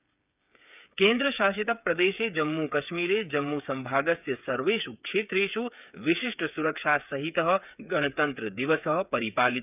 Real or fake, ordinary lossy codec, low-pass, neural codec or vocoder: fake; none; 3.6 kHz; codec, 16 kHz, 8 kbps, FreqCodec, smaller model